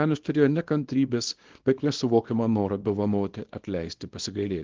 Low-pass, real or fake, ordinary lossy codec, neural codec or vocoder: 7.2 kHz; fake; Opus, 16 kbps; codec, 24 kHz, 0.9 kbps, WavTokenizer, small release